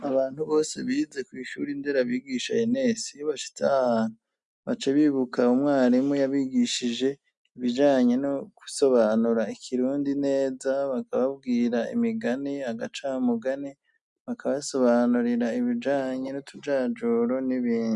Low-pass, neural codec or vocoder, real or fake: 10.8 kHz; none; real